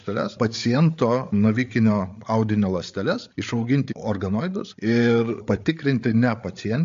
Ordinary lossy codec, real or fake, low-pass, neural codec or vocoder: MP3, 48 kbps; fake; 7.2 kHz; codec, 16 kHz, 8 kbps, FreqCodec, larger model